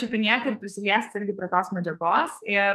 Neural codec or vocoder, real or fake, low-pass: codec, 44.1 kHz, 2.6 kbps, SNAC; fake; 14.4 kHz